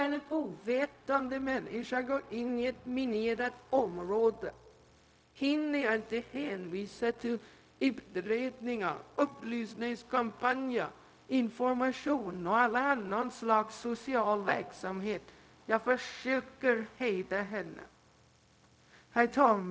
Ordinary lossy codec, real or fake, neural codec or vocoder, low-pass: none; fake; codec, 16 kHz, 0.4 kbps, LongCat-Audio-Codec; none